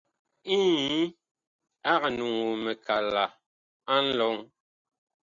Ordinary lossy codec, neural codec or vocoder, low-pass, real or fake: Opus, 64 kbps; none; 7.2 kHz; real